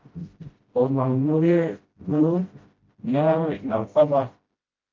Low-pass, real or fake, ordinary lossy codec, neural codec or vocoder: 7.2 kHz; fake; Opus, 24 kbps; codec, 16 kHz, 0.5 kbps, FreqCodec, smaller model